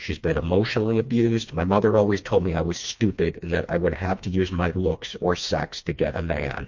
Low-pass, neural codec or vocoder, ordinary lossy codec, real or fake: 7.2 kHz; codec, 16 kHz, 2 kbps, FreqCodec, smaller model; MP3, 48 kbps; fake